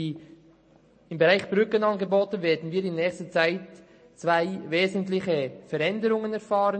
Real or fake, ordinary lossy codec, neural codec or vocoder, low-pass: real; MP3, 32 kbps; none; 9.9 kHz